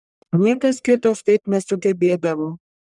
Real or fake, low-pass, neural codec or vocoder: fake; 10.8 kHz; codec, 44.1 kHz, 1.7 kbps, Pupu-Codec